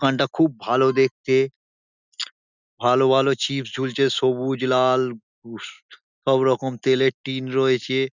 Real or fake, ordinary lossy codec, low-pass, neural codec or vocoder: real; none; 7.2 kHz; none